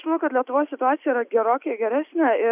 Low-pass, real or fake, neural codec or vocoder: 3.6 kHz; real; none